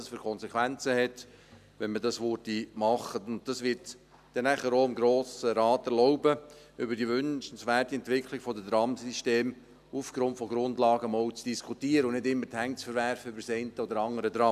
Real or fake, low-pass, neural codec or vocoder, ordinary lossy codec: real; 14.4 kHz; none; none